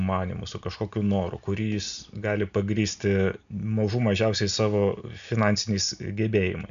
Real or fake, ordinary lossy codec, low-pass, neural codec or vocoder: real; MP3, 96 kbps; 7.2 kHz; none